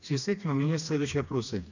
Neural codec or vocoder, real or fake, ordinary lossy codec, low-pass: codec, 16 kHz, 2 kbps, FreqCodec, smaller model; fake; none; 7.2 kHz